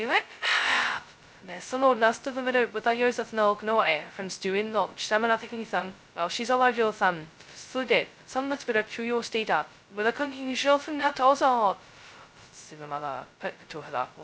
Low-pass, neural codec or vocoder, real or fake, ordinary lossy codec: none; codec, 16 kHz, 0.2 kbps, FocalCodec; fake; none